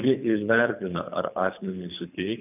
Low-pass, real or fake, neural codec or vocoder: 3.6 kHz; fake; codec, 24 kHz, 3 kbps, HILCodec